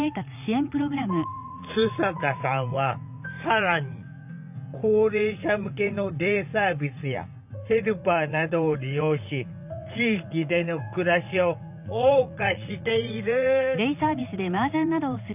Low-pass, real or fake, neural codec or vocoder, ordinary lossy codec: 3.6 kHz; fake; vocoder, 44.1 kHz, 80 mel bands, Vocos; none